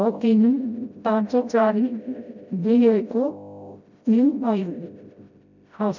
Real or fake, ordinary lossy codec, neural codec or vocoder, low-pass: fake; MP3, 64 kbps; codec, 16 kHz, 0.5 kbps, FreqCodec, smaller model; 7.2 kHz